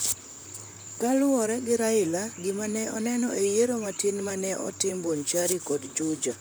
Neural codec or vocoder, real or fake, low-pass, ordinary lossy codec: vocoder, 44.1 kHz, 128 mel bands, Pupu-Vocoder; fake; none; none